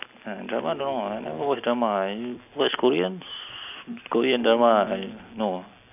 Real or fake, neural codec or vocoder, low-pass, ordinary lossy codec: real; none; 3.6 kHz; none